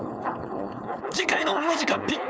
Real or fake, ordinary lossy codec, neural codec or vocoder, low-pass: fake; none; codec, 16 kHz, 4.8 kbps, FACodec; none